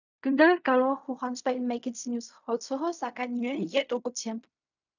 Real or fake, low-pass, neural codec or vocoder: fake; 7.2 kHz; codec, 16 kHz in and 24 kHz out, 0.4 kbps, LongCat-Audio-Codec, fine tuned four codebook decoder